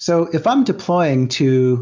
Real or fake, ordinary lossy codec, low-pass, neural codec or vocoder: real; MP3, 64 kbps; 7.2 kHz; none